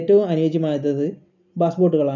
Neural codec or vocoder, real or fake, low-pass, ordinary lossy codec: none; real; 7.2 kHz; none